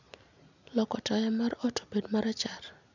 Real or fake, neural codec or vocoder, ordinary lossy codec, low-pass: real; none; none; 7.2 kHz